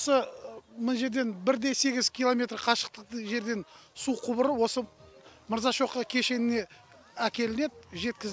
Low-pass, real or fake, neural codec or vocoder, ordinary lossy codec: none; real; none; none